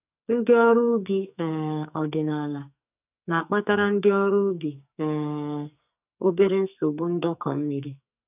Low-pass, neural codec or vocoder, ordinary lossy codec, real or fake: 3.6 kHz; codec, 44.1 kHz, 2.6 kbps, SNAC; none; fake